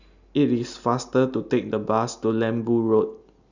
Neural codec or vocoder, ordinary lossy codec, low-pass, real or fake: none; none; 7.2 kHz; real